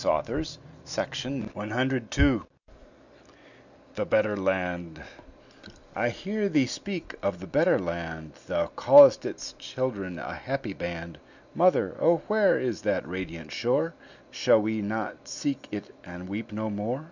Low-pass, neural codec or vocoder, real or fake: 7.2 kHz; none; real